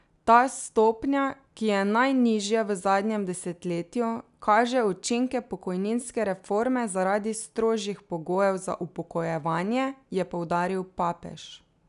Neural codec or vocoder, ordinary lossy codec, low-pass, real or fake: none; none; 10.8 kHz; real